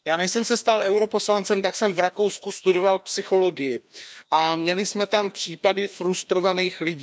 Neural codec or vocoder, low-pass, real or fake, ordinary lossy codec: codec, 16 kHz, 1 kbps, FreqCodec, larger model; none; fake; none